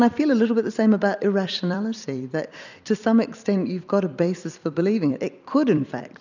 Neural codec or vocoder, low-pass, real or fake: vocoder, 44.1 kHz, 128 mel bands every 256 samples, BigVGAN v2; 7.2 kHz; fake